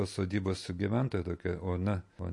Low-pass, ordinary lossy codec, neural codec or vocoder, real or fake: 10.8 kHz; MP3, 48 kbps; none; real